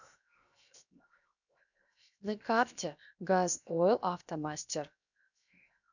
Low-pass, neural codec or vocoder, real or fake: 7.2 kHz; codec, 16 kHz, 0.7 kbps, FocalCodec; fake